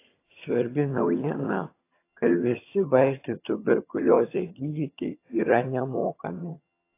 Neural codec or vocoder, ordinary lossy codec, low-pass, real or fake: vocoder, 22.05 kHz, 80 mel bands, HiFi-GAN; AAC, 24 kbps; 3.6 kHz; fake